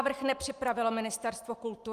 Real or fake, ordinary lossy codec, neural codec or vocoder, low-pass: real; Opus, 32 kbps; none; 14.4 kHz